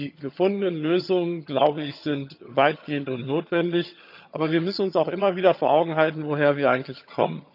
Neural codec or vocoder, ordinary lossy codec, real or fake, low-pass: vocoder, 22.05 kHz, 80 mel bands, HiFi-GAN; none; fake; 5.4 kHz